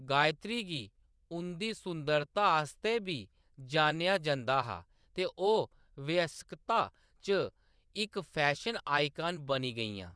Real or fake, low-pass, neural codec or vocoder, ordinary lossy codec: fake; none; vocoder, 22.05 kHz, 80 mel bands, WaveNeXt; none